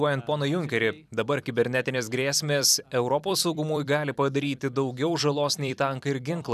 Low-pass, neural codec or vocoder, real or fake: 14.4 kHz; vocoder, 44.1 kHz, 128 mel bands every 256 samples, BigVGAN v2; fake